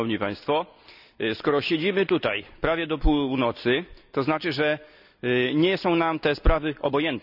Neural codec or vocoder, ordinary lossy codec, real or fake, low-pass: none; none; real; 5.4 kHz